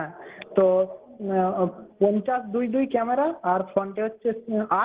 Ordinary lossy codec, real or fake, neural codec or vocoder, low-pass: Opus, 16 kbps; real; none; 3.6 kHz